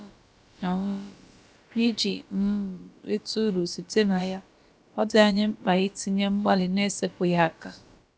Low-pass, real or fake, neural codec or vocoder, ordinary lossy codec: none; fake; codec, 16 kHz, about 1 kbps, DyCAST, with the encoder's durations; none